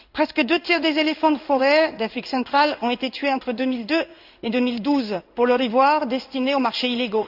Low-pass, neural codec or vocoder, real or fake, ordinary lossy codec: 5.4 kHz; codec, 16 kHz in and 24 kHz out, 1 kbps, XY-Tokenizer; fake; Opus, 64 kbps